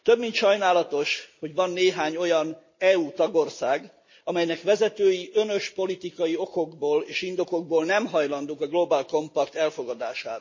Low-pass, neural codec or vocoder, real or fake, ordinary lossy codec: 7.2 kHz; none; real; MP3, 32 kbps